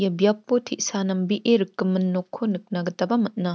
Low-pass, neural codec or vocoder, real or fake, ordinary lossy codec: none; none; real; none